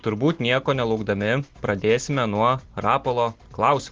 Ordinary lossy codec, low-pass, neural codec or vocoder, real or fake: Opus, 16 kbps; 7.2 kHz; none; real